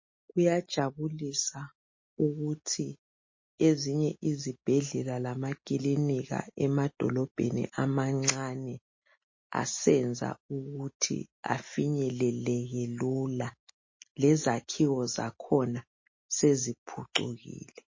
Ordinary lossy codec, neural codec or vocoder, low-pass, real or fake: MP3, 32 kbps; none; 7.2 kHz; real